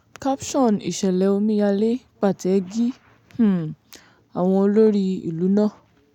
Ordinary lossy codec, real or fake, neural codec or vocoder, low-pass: none; real; none; 19.8 kHz